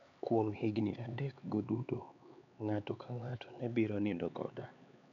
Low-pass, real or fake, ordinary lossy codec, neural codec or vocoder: 7.2 kHz; fake; MP3, 64 kbps; codec, 16 kHz, 4 kbps, X-Codec, HuBERT features, trained on LibriSpeech